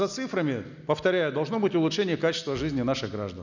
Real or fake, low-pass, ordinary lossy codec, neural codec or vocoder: real; 7.2 kHz; none; none